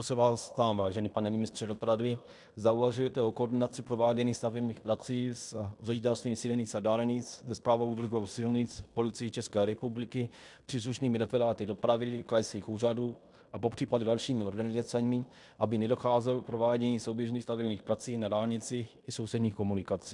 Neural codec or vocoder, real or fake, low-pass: codec, 16 kHz in and 24 kHz out, 0.9 kbps, LongCat-Audio-Codec, fine tuned four codebook decoder; fake; 10.8 kHz